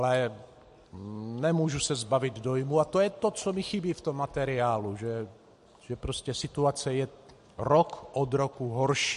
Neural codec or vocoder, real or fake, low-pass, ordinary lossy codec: none; real; 14.4 kHz; MP3, 48 kbps